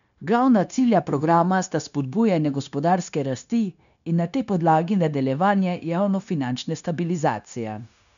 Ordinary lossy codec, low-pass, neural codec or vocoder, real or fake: none; 7.2 kHz; codec, 16 kHz, 0.9 kbps, LongCat-Audio-Codec; fake